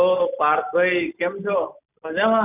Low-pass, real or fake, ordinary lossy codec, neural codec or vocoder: 3.6 kHz; real; none; none